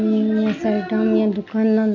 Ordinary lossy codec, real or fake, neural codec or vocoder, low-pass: MP3, 48 kbps; real; none; 7.2 kHz